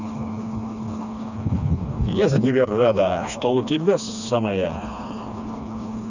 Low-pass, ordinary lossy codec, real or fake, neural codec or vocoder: 7.2 kHz; none; fake; codec, 16 kHz, 2 kbps, FreqCodec, smaller model